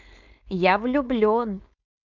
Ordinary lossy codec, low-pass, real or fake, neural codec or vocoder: none; 7.2 kHz; fake; codec, 16 kHz, 4.8 kbps, FACodec